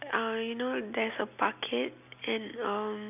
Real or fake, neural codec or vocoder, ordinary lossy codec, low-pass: real; none; none; 3.6 kHz